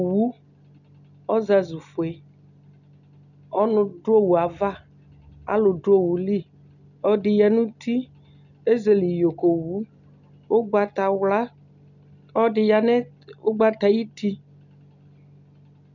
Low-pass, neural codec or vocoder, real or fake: 7.2 kHz; none; real